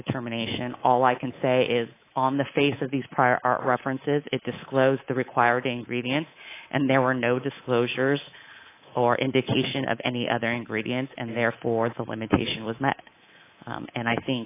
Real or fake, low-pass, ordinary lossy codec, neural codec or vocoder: fake; 3.6 kHz; AAC, 24 kbps; codec, 24 kHz, 3.1 kbps, DualCodec